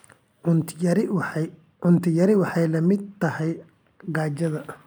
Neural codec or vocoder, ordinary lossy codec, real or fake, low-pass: none; none; real; none